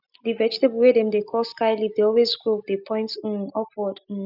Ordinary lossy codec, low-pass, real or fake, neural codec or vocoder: none; 5.4 kHz; real; none